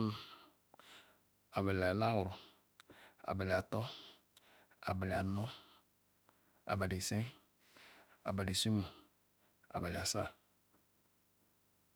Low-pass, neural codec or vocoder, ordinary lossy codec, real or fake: none; autoencoder, 48 kHz, 32 numbers a frame, DAC-VAE, trained on Japanese speech; none; fake